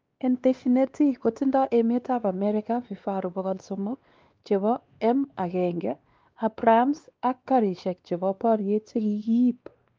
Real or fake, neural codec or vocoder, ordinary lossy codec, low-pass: fake; codec, 16 kHz, 2 kbps, X-Codec, WavLM features, trained on Multilingual LibriSpeech; Opus, 24 kbps; 7.2 kHz